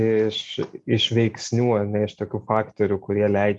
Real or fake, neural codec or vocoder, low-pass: real; none; 10.8 kHz